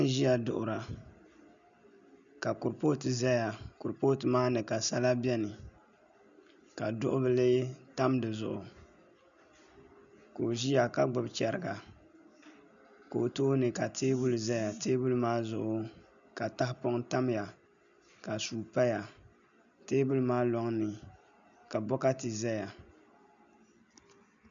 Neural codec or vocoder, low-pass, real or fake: none; 7.2 kHz; real